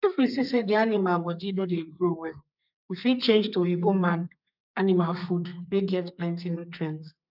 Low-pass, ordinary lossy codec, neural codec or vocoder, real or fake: 5.4 kHz; none; codec, 32 kHz, 1.9 kbps, SNAC; fake